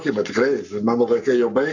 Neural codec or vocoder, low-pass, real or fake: codec, 44.1 kHz, 7.8 kbps, Pupu-Codec; 7.2 kHz; fake